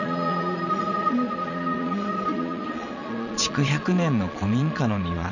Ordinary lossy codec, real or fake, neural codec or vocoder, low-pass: none; fake; vocoder, 22.05 kHz, 80 mel bands, Vocos; 7.2 kHz